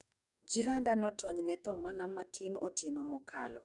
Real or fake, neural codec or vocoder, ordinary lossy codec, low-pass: fake; codec, 44.1 kHz, 2.6 kbps, DAC; none; 10.8 kHz